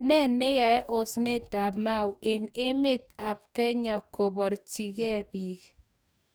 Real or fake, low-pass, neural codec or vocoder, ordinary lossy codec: fake; none; codec, 44.1 kHz, 2.6 kbps, DAC; none